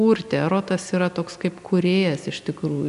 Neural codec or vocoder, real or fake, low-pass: none; real; 10.8 kHz